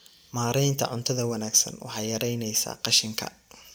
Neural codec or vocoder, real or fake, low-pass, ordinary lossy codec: vocoder, 44.1 kHz, 128 mel bands every 256 samples, BigVGAN v2; fake; none; none